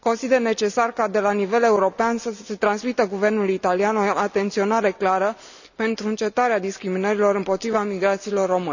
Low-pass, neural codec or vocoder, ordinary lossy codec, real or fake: 7.2 kHz; none; none; real